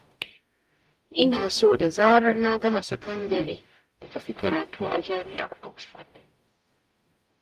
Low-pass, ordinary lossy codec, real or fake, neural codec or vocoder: 14.4 kHz; Opus, 32 kbps; fake; codec, 44.1 kHz, 0.9 kbps, DAC